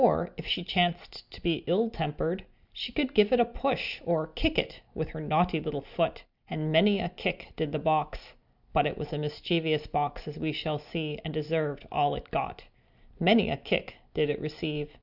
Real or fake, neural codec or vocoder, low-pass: real; none; 5.4 kHz